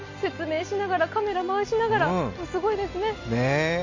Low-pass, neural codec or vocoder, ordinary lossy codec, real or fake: 7.2 kHz; none; AAC, 48 kbps; real